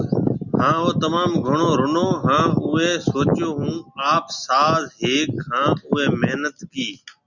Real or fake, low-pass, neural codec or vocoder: real; 7.2 kHz; none